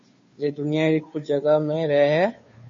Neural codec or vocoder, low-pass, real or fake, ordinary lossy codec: codec, 16 kHz, 2 kbps, FunCodec, trained on Chinese and English, 25 frames a second; 7.2 kHz; fake; MP3, 32 kbps